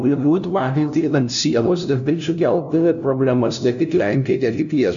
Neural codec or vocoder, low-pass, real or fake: codec, 16 kHz, 0.5 kbps, FunCodec, trained on LibriTTS, 25 frames a second; 7.2 kHz; fake